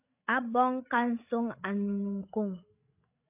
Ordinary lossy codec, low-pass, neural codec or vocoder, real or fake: AAC, 24 kbps; 3.6 kHz; codec, 16 kHz, 16 kbps, FreqCodec, larger model; fake